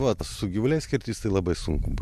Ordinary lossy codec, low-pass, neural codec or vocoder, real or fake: MP3, 64 kbps; 14.4 kHz; none; real